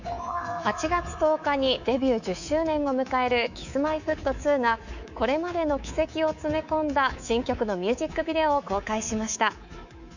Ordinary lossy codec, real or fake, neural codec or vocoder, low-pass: none; fake; codec, 24 kHz, 3.1 kbps, DualCodec; 7.2 kHz